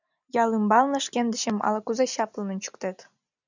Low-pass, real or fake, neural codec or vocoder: 7.2 kHz; real; none